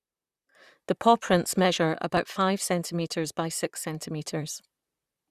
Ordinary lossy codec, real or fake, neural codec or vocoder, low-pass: Opus, 64 kbps; fake; vocoder, 44.1 kHz, 128 mel bands, Pupu-Vocoder; 14.4 kHz